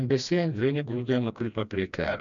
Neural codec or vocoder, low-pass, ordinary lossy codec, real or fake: codec, 16 kHz, 1 kbps, FreqCodec, smaller model; 7.2 kHz; MP3, 96 kbps; fake